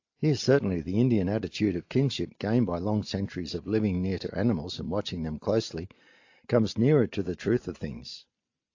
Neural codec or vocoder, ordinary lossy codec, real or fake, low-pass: none; AAC, 48 kbps; real; 7.2 kHz